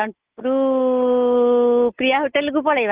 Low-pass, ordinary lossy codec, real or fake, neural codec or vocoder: 3.6 kHz; Opus, 16 kbps; real; none